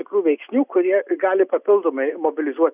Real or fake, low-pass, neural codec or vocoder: real; 3.6 kHz; none